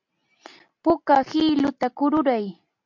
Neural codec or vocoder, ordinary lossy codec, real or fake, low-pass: none; MP3, 48 kbps; real; 7.2 kHz